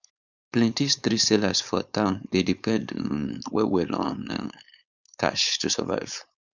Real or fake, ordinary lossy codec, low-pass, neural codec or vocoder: fake; none; 7.2 kHz; codec, 16 kHz, 4.8 kbps, FACodec